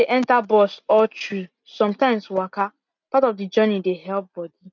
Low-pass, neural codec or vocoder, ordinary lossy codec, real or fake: 7.2 kHz; none; none; real